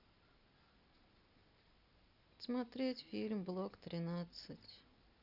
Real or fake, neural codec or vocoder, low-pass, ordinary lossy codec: real; none; 5.4 kHz; none